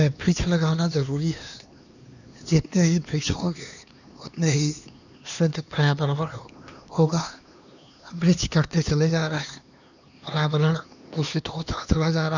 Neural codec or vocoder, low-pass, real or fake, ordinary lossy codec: codec, 16 kHz, 2 kbps, X-Codec, HuBERT features, trained on LibriSpeech; 7.2 kHz; fake; none